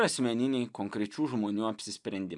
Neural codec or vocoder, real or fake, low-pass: none; real; 10.8 kHz